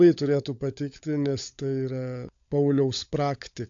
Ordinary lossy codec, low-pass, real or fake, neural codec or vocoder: AAC, 64 kbps; 7.2 kHz; fake; codec, 16 kHz, 16 kbps, FunCodec, trained on LibriTTS, 50 frames a second